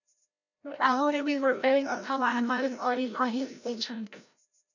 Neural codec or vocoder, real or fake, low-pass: codec, 16 kHz, 0.5 kbps, FreqCodec, larger model; fake; 7.2 kHz